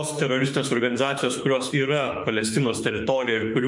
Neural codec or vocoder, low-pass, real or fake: autoencoder, 48 kHz, 32 numbers a frame, DAC-VAE, trained on Japanese speech; 10.8 kHz; fake